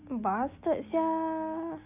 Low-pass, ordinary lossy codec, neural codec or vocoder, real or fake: 3.6 kHz; none; none; real